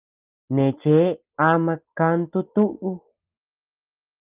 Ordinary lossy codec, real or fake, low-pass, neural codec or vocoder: Opus, 32 kbps; real; 3.6 kHz; none